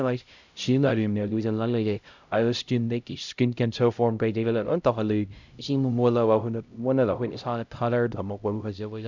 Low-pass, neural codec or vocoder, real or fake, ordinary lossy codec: 7.2 kHz; codec, 16 kHz, 0.5 kbps, X-Codec, HuBERT features, trained on LibriSpeech; fake; none